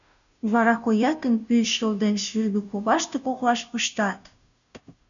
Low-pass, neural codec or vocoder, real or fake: 7.2 kHz; codec, 16 kHz, 0.5 kbps, FunCodec, trained on Chinese and English, 25 frames a second; fake